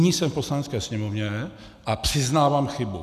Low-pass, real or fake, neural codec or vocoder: 14.4 kHz; real; none